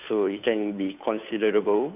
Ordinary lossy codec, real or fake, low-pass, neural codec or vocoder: none; fake; 3.6 kHz; codec, 16 kHz, 2 kbps, FunCodec, trained on Chinese and English, 25 frames a second